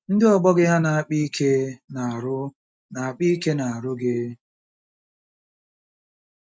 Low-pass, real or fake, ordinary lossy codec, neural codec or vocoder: none; real; none; none